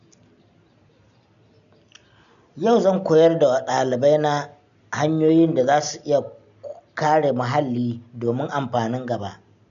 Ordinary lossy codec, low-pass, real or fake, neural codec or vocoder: none; 7.2 kHz; real; none